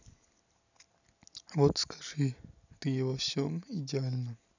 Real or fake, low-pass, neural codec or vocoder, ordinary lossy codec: real; 7.2 kHz; none; none